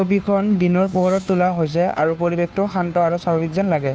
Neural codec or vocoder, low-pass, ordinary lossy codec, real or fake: codec, 16 kHz, 2 kbps, FunCodec, trained on Chinese and English, 25 frames a second; none; none; fake